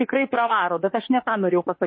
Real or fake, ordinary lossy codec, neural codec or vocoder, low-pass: fake; MP3, 24 kbps; autoencoder, 48 kHz, 32 numbers a frame, DAC-VAE, trained on Japanese speech; 7.2 kHz